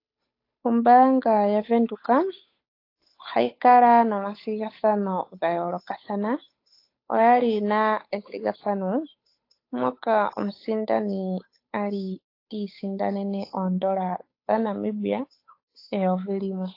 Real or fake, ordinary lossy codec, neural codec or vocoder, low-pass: fake; AAC, 32 kbps; codec, 16 kHz, 8 kbps, FunCodec, trained on Chinese and English, 25 frames a second; 5.4 kHz